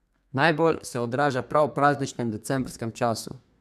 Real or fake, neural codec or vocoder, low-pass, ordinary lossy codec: fake; codec, 32 kHz, 1.9 kbps, SNAC; 14.4 kHz; none